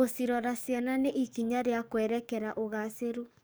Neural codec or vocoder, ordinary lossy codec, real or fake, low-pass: codec, 44.1 kHz, 7.8 kbps, DAC; none; fake; none